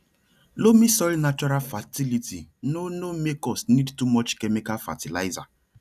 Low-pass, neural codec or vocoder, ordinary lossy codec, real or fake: 14.4 kHz; none; none; real